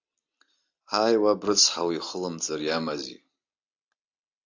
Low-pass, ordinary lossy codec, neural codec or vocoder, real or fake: 7.2 kHz; AAC, 48 kbps; none; real